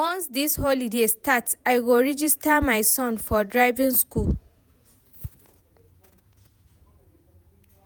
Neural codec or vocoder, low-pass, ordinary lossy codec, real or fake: vocoder, 48 kHz, 128 mel bands, Vocos; none; none; fake